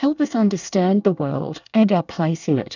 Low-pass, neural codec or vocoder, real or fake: 7.2 kHz; codec, 24 kHz, 1 kbps, SNAC; fake